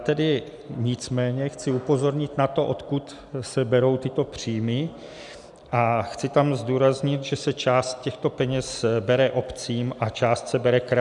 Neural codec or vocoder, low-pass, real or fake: none; 10.8 kHz; real